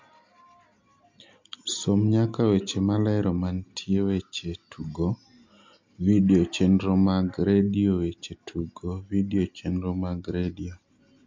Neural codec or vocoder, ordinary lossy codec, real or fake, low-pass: none; MP3, 48 kbps; real; 7.2 kHz